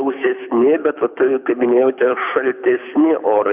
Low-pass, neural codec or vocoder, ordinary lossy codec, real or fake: 3.6 kHz; codec, 24 kHz, 6 kbps, HILCodec; AAC, 32 kbps; fake